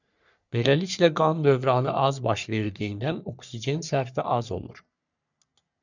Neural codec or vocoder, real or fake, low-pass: codec, 44.1 kHz, 3.4 kbps, Pupu-Codec; fake; 7.2 kHz